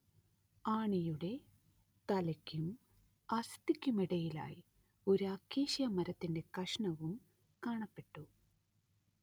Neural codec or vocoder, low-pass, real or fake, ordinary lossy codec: none; none; real; none